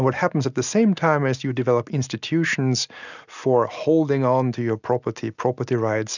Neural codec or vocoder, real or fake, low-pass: none; real; 7.2 kHz